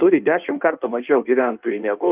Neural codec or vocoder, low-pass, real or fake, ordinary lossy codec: codec, 16 kHz in and 24 kHz out, 1.1 kbps, FireRedTTS-2 codec; 3.6 kHz; fake; Opus, 24 kbps